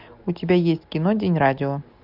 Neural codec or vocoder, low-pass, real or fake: none; 5.4 kHz; real